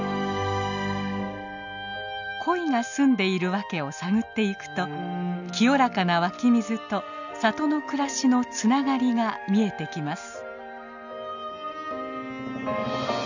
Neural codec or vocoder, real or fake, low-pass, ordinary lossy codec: none; real; 7.2 kHz; none